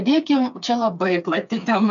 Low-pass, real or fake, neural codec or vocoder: 7.2 kHz; fake; codec, 16 kHz, 4 kbps, FreqCodec, smaller model